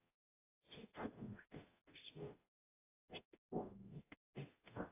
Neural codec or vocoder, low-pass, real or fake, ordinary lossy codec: codec, 44.1 kHz, 0.9 kbps, DAC; 3.6 kHz; fake; AAC, 16 kbps